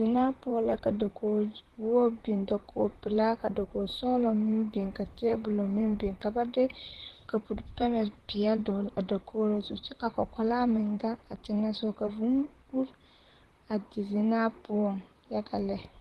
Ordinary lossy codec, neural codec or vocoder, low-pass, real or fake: Opus, 16 kbps; vocoder, 44.1 kHz, 128 mel bands, Pupu-Vocoder; 14.4 kHz; fake